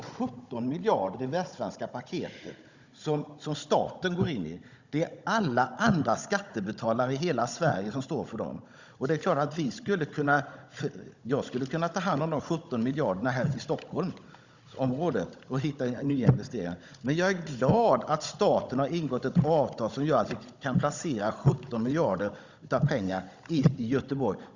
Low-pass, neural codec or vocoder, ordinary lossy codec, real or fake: 7.2 kHz; codec, 16 kHz, 16 kbps, FunCodec, trained on Chinese and English, 50 frames a second; Opus, 64 kbps; fake